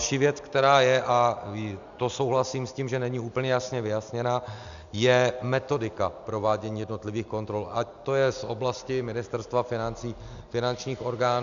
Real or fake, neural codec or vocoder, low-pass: real; none; 7.2 kHz